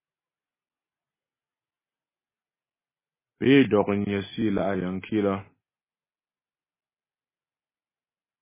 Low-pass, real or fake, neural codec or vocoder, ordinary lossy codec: 3.6 kHz; real; none; MP3, 16 kbps